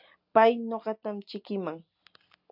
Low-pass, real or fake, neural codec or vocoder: 5.4 kHz; real; none